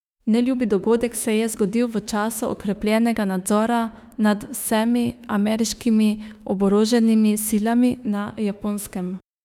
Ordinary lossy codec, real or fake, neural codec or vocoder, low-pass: none; fake; autoencoder, 48 kHz, 32 numbers a frame, DAC-VAE, trained on Japanese speech; 19.8 kHz